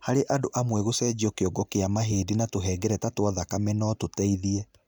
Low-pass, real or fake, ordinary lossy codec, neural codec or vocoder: none; real; none; none